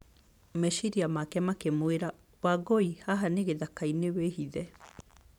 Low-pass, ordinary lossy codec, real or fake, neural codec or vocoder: 19.8 kHz; none; fake; vocoder, 44.1 kHz, 128 mel bands every 512 samples, BigVGAN v2